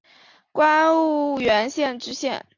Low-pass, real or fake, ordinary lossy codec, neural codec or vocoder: 7.2 kHz; real; AAC, 48 kbps; none